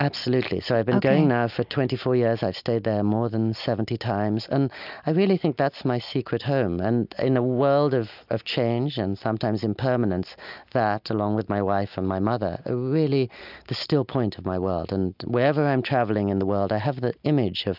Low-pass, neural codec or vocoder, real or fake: 5.4 kHz; none; real